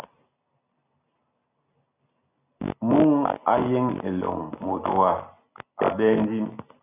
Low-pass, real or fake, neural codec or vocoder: 3.6 kHz; real; none